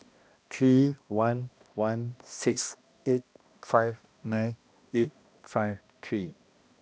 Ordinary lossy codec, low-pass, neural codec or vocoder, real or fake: none; none; codec, 16 kHz, 1 kbps, X-Codec, HuBERT features, trained on balanced general audio; fake